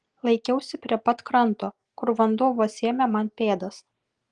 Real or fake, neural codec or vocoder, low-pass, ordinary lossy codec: real; none; 9.9 kHz; Opus, 24 kbps